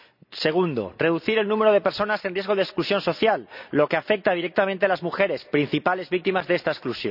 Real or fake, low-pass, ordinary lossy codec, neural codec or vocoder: real; 5.4 kHz; none; none